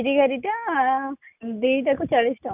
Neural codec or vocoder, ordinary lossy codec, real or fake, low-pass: none; AAC, 32 kbps; real; 3.6 kHz